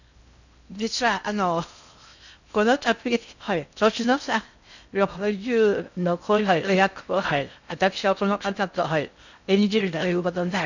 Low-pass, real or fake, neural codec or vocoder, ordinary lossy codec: 7.2 kHz; fake; codec, 16 kHz in and 24 kHz out, 0.6 kbps, FocalCodec, streaming, 2048 codes; none